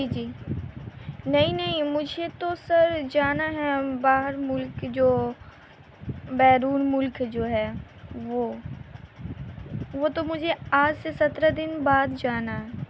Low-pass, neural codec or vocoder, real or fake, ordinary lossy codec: none; none; real; none